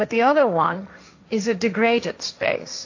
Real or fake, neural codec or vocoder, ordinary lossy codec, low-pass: fake; codec, 16 kHz, 1.1 kbps, Voila-Tokenizer; AAC, 48 kbps; 7.2 kHz